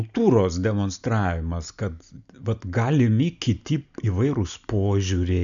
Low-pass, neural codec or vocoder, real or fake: 7.2 kHz; none; real